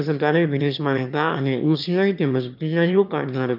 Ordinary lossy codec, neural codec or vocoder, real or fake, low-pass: none; autoencoder, 22.05 kHz, a latent of 192 numbers a frame, VITS, trained on one speaker; fake; 5.4 kHz